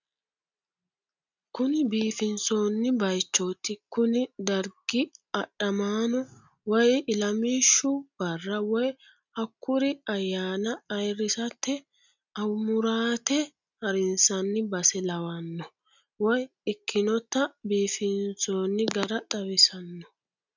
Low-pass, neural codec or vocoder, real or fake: 7.2 kHz; none; real